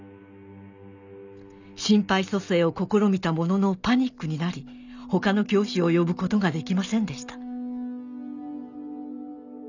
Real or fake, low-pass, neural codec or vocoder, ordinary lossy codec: real; 7.2 kHz; none; none